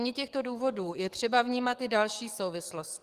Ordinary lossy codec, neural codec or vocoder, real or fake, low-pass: Opus, 24 kbps; codec, 44.1 kHz, 7.8 kbps, DAC; fake; 14.4 kHz